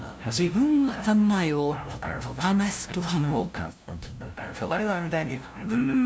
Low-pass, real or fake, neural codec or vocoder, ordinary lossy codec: none; fake; codec, 16 kHz, 0.5 kbps, FunCodec, trained on LibriTTS, 25 frames a second; none